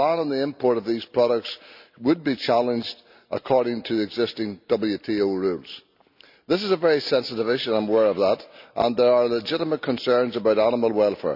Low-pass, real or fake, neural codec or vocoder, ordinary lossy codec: 5.4 kHz; real; none; none